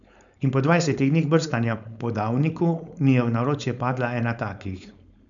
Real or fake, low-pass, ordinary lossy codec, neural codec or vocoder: fake; 7.2 kHz; none; codec, 16 kHz, 4.8 kbps, FACodec